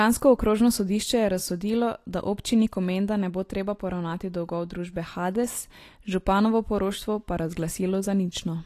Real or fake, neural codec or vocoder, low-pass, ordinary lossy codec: real; none; 14.4 kHz; AAC, 48 kbps